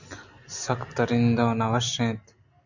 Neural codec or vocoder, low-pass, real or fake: none; 7.2 kHz; real